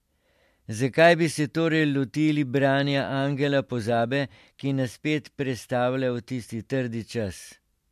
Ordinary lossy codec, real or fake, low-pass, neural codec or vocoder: MP3, 64 kbps; real; 14.4 kHz; none